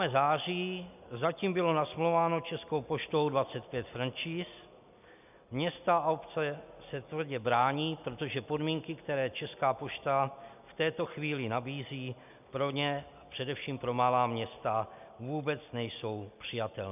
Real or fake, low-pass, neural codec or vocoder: real; 3.6 kHz; none